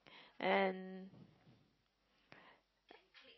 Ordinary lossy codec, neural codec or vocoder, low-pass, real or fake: MP3, 24 kbps; none; 7.2 kHz; real